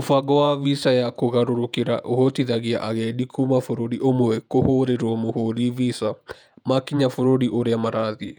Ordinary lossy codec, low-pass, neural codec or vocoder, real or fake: none; 19.8 kHz; vocoder, 48 kHz, 128 mel bands, Vocos; fake